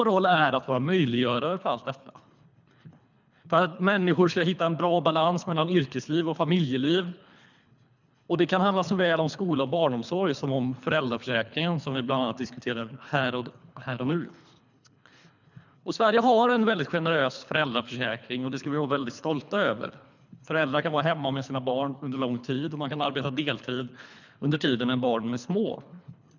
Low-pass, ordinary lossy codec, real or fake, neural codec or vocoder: 7.2 kHz; none; fake; codec, 24 kHz, 3 kbps, HILCodec